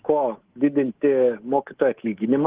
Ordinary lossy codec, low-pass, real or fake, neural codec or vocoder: Opus, 16 kbps; 3.6 kHz; fake; codec, 24 kHz, 3.1 kbps, DualCodec